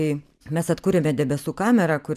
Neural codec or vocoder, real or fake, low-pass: vocoder, 44.1 kHz, 128 mel bands every 512 samples, BigVGAN v2; fake; 14.4 kHz